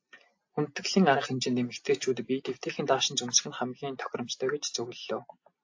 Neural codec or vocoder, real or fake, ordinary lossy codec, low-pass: none; real; AAC, 48 kbps; 7.2 kHz